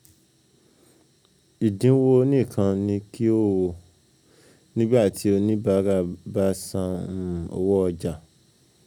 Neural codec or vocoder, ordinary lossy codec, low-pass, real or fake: none; none; 19.8 kHz; real